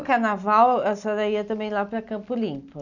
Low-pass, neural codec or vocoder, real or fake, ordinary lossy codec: 7.2 kHz; none; real; none